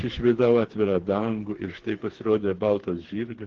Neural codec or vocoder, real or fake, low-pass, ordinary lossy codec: codec, 16 kHz, 4 kbps, FreqCodec, smaller model; fake; 7.2 kHz; Opus, 16 kbps